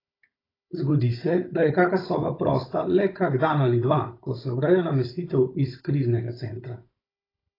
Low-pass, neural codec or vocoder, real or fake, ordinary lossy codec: 5.4 kHz; codec, 16 kHz, 16 kbps, FunCodec, trained on Chinese and English, 50 frames a second; fake; AAC, 24 kbps